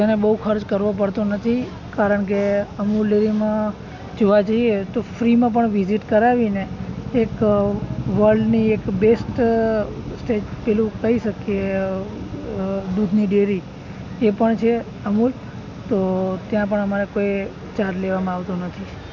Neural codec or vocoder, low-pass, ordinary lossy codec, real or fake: none; 7.2 kHz; none; real